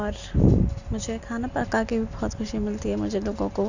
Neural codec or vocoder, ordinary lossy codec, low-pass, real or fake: none; none; 7.2 kHz; real